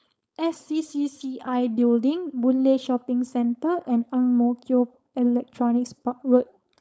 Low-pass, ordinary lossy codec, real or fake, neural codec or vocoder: none; none; fake; codec, 16 kHz, 4.8 kbps, FACodec